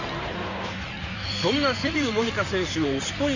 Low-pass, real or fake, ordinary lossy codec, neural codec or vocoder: 7.2 kHz; fake; none; codec, 16 kHz in and 24 kHz out, 2.2 kbps, FireRedTTS-2 codec